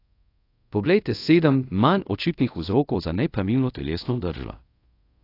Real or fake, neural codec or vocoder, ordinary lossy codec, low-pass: fake; codec, 24 kHz, 0.5 kbps, DualCodec; AAC, 32 kbps; 5.4 kHz